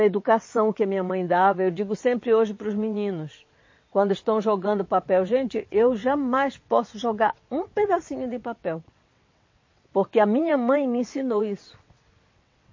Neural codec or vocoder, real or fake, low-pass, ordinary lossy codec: vocoder, 22.05 kHz, 80 mel bands, WaveNeXt; fake; 7.2 kHz; MP3, 32 kbps